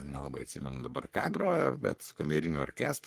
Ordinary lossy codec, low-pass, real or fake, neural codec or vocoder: Opus, 24 kbps; 14.4 kHz; fake; codec, 44.1 kHz, 3.4 kbps, Pupu-Codec